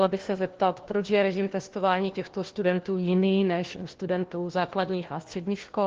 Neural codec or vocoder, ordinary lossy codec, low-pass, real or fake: codec, 16 kHz, 1 kbps, FunCodec, trained on LibriTTS, 50 frames a second; Opus, 16 kbps; 7.2 kHz; fake